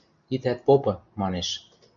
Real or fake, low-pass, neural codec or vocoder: real; 7.2 kHz; none